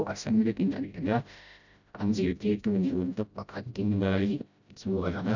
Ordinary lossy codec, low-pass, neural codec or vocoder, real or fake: none; 7.2 kHz; codec, 16 kHz, 0.5 kbps, FreqCodec, smaller model; fake